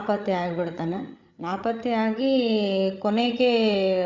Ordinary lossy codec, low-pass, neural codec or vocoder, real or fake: Opus, 64 kbps; 7.2 kHz; codec, 16 kHz, 8 kbps, FreqCodec, larger model; fake